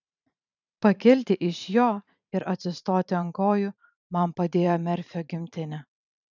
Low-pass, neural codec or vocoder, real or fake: 7.2 kHz; vocoder, 44.1 kHz, 128 mel bands every 512 samples, BigVGAN v2; fake